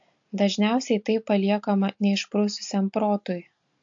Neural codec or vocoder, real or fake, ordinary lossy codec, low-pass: none; real; AAC, 64 kbps; 7.2 kHz